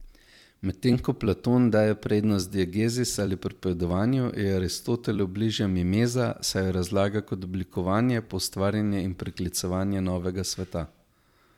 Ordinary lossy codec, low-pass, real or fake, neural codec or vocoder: MP3, 96 kbps; 19.8 kHz; fake; vocoder, 44.1 kHz, 128 mel bands every 256 samples, BigVGAN v2